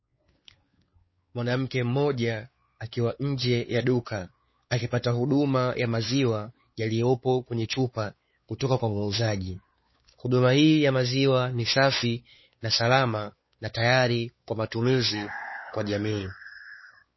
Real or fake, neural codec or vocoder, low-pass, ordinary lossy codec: fake; codec, 16 kHz, 4 kbps, X-Codec, WavLM features, trained on Multilingual LibriSpeech; 7.2 kHz; MP3, 24 kbps